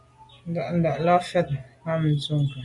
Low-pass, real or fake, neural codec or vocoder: 10.8 kHz; real; none